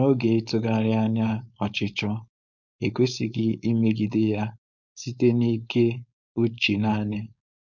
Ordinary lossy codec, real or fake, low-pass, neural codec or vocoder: none; fake; 7.2 kHz; codec, 16 kHz, 4.8 kbps, FACodec